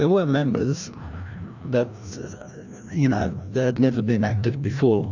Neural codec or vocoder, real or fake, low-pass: codec, 16 kHz, 1 kbps, FreqCodec, larger model; fake; 7.2 kHz